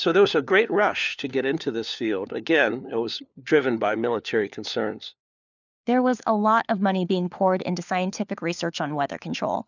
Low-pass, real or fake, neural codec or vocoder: 7.2 kHz; fake; codec, 16 kHz, 4 kbps, FunCodec, trained on LibriTTS, 50 frames a second